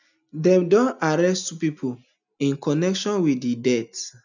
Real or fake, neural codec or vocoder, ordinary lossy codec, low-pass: real; none; none; 7.2 kHz